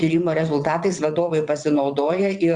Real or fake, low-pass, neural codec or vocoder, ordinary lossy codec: fake; 9.9 kHz; vocoder, 22.05 kHz, 80 mel bands, WaveNeXt; Opus, 64 kbps